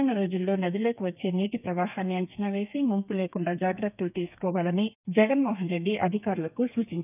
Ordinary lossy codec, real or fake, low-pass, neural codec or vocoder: none; fake; 3.6 kHz; codec, 32 kHz, 1.9 kbps, SNAC